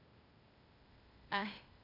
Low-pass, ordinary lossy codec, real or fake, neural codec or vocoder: 5.4 kHz; none; fake; codec, 16 kHz, 0.8 kbps, ZipCodec